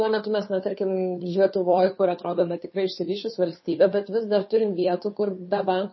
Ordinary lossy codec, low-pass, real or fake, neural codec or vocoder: MP3, 24 kbps; 7.2 kHz; fake; codec, 16 kHz, 2 kbps, FunCodec, trained on Chinese and English, 25 frames a second